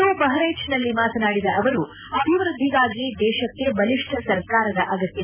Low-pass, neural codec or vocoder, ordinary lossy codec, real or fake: 3.6 kHz; none; none; real